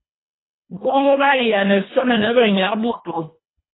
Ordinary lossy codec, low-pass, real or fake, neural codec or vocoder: AAC, 16 kbps; 7.2 kHz; fake; codec, 24 kHz, 1.5 kbps, HILCodec